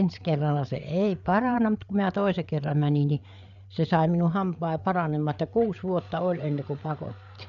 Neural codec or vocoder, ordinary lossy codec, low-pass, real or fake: codec, 16 kHz, 8 kbps, FreqCodec, larger model; none; 7.2 kHz; fake